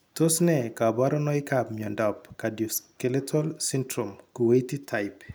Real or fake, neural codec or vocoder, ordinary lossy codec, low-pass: real; none; none; none